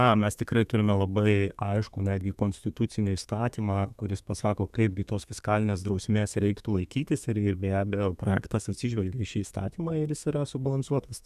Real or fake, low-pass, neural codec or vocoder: fake; 14.4 kHz; codec, 32 kHz, 1.9 kbps, SNAC